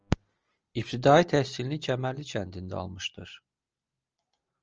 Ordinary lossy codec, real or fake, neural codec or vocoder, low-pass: Opus, 24 kbps; real; none; 7.2 kHz